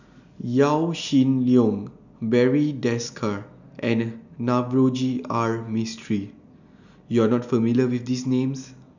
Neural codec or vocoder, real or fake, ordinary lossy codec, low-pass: none; real; none; 7.2 kHz